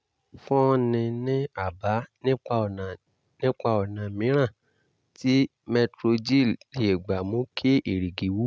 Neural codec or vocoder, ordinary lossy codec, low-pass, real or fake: none; none; none; real